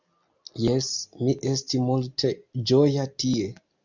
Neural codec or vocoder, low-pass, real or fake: none; 7.2 kHz; real